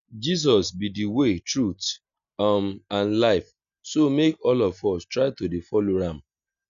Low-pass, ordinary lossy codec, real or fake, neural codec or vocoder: 7.2 kHz; none; real; none